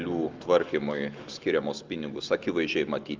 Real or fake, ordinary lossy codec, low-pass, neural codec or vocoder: real; Opus, 32 kbps; 7.2 kHz; none